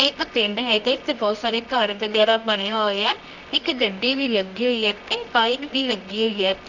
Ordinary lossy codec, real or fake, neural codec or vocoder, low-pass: none; fake; codec, 24 kHz, 0.9 kbps, WavTokenizer, medium music audio release; 7.2 kHz